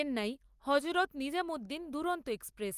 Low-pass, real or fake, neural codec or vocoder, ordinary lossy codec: 14.4 kHz; real; none; Opus, 32 kbps